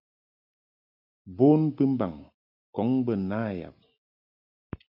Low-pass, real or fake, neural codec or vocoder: 5.4 kHz; real; none